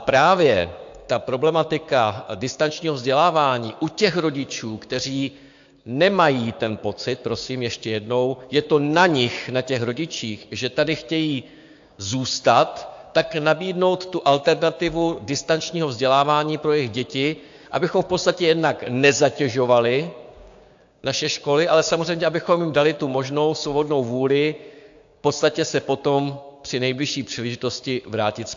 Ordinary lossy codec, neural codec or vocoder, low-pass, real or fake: AAC, 64 kbps; codec, 16 kHz, 6 kbps, DAC; 7.2 kHz; fake